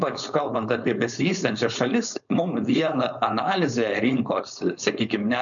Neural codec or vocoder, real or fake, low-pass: codec, 16 kHz, 4.8 kbps, FACodec; fake; 7.2 kHz